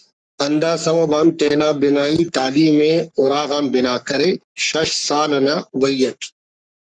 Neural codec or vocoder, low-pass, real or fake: codec, 44.1 kHz, 3.4 kbps, Pupu-Codec; 9.9 kHz; fake